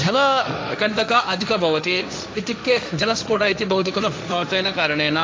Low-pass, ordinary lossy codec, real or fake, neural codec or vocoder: none; none; fake; codec, 16 kHz, 1.1 kbps, Voila-Tokenizer